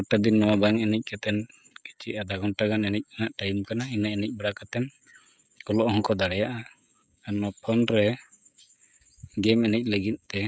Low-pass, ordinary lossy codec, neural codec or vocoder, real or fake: none; none; codec, 16 kHz, 16 kbps, FreqCodec, smaller model; fake